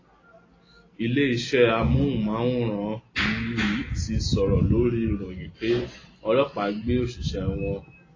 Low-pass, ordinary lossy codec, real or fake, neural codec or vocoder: 7.2 kHz; AAC, 32 kbps; real; none